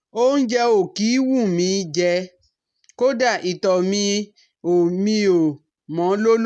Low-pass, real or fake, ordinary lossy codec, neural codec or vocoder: none; real; none; none